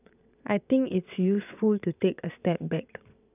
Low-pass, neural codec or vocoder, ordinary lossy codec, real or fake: 3.6 kHz; vocoder, 22.05 kHz, 80 mel bands, WaveNeXt; none; fake